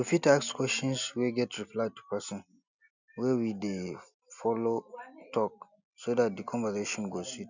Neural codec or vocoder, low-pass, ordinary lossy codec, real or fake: none; 7.2 kHz; none; real